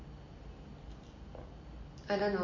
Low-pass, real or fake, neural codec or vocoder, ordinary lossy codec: 7.2 kHz; real; none; MP3, 32 kbps